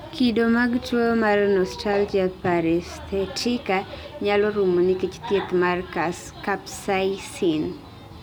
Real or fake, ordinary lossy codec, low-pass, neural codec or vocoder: real; none; none; none